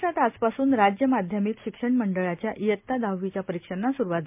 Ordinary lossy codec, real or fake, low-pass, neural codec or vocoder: MP3, 32 kbps; real; 3.6 kHz; none